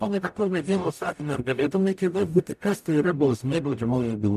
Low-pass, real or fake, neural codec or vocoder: 14.4 kHz; fake; codec, 44.1 kHz, 0.9 kbps, DAC